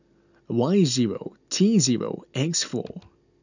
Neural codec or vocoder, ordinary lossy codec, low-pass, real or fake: none; none; 7.2 kHz; real